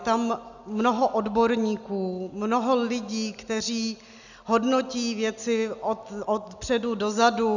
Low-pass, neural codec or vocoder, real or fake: 7.2 kHz; none; real